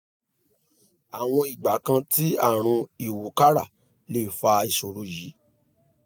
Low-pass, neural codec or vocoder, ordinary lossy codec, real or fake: none; none; none; real